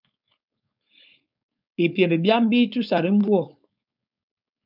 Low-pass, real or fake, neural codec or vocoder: 5.4 kHz; fake; codec, 16 kHz, 4.8 kbps, FACodec